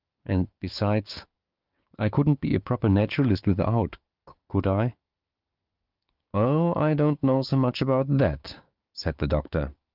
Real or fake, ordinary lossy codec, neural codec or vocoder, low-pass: fake; Opus, 32 kbps; codec, 16 kHz, 6 kbps, DAC; 5.4 kHz